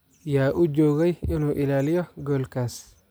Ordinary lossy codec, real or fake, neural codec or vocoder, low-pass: none; real; none; none